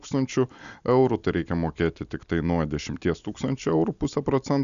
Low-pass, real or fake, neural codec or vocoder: 7.2 kHz; real; none